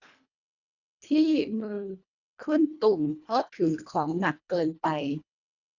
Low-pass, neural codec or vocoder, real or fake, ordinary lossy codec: 7.2 kHz; codec, 24 kHz, 1.5 kbps, HILCodec; fake; none